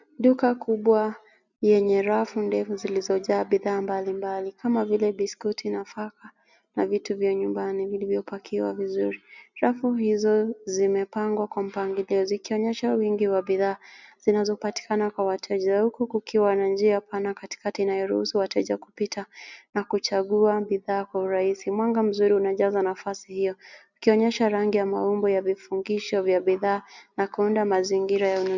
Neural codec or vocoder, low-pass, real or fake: none; 7.2 kHz; real